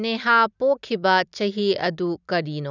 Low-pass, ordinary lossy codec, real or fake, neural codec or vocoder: 7.2 kHz; none; real; none